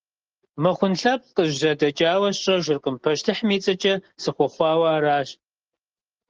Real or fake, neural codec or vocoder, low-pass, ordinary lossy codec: real; none; 7.2 kHz; Opus, 16 kbps